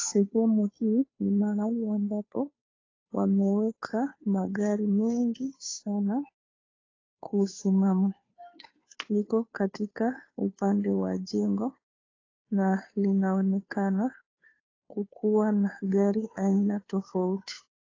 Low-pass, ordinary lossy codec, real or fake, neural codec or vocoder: 7.2 kHz; AAC, 32 kbps; fake; codec, 16 kHz, 2 kbps, FunCodec, trained on Chinese and English, 25 frames a second